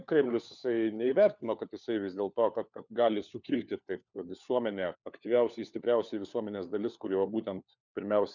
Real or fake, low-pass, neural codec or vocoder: fake; 7.2 kHz; codec, 16 kHz, 4 kbps, FunCodec, trained on LibriTTS, 50 frames a second